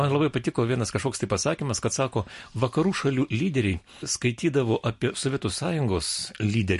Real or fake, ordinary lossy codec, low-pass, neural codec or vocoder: real; MP3, 48 kbps; 14.4 kHz; none